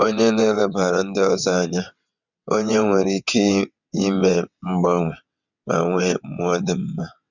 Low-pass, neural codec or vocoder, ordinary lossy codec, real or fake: 7.2 kHz; vocoder, 22.05 kHz, 80 mel bands, WaveNeXt; none; fake